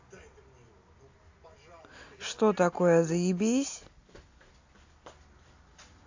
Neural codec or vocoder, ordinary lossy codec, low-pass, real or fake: none; AAC, 48 kbps; 7.2 kHz; real